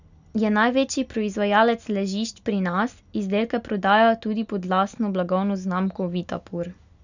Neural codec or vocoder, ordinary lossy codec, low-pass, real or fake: none; none; 7.2 kHz; real